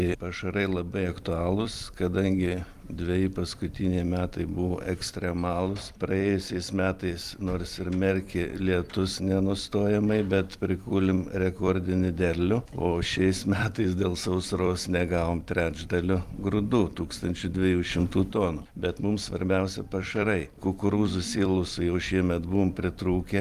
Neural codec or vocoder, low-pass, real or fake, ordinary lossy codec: vocoder, 44.1 kHz, 128 mel bands every 256 samples, BigVGAN v2; 14.4 kHz; fake; Opus, 32 kbps